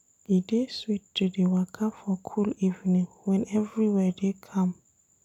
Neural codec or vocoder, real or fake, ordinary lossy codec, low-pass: none; real; none; 19.8 kHz